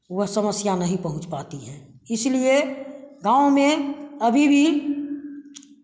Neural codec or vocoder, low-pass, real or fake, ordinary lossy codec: none; none; real; none